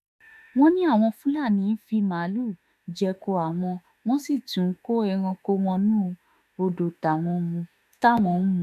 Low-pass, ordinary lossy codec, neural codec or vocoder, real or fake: 14.4 kHz; none; autoencoder, 48 kHz, 32 numbers a frame, DAC-VAE, trained on Japanese speech; fake